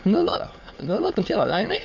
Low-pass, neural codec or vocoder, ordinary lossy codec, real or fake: 7.2 kHz; autoencoder, 22.05 kHz, a latent of 192 numbers a frame, VITS, trained on many speakers; AAC, 48 kbps; fake